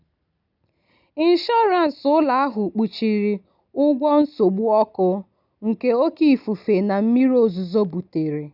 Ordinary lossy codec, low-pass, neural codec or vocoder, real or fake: none; 5.4 kHz; none; real